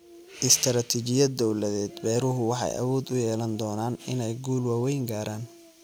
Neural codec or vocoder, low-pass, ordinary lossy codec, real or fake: none; none; none; real